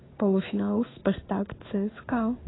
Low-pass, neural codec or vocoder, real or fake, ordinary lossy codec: 7.2 kHz; codec, 16 kHz in and 24 kHz out, 1 kbps, XY-Tokenizer; fake; AAC, 16 kbps